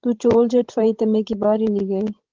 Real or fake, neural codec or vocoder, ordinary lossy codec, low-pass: fake; codec, 16 kHz, 16 kbps, FreqCodec, larger model; Opus, 16 kbps; 7.2 kHz